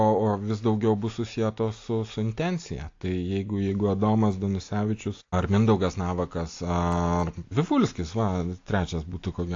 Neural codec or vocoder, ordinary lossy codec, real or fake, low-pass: none; AAC, 48 kbps; real; 7.2 kHz